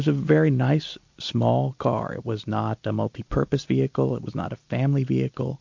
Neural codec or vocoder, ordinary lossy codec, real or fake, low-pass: none; MP3, 48 kbps; real; 7.2 kHz